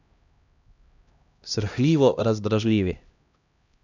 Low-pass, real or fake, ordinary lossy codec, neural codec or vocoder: 7.2 kHz; fake; none; codec, 16 kHz, 1 kbps, X-Codec, HuBERT features, trained on LibriSpeech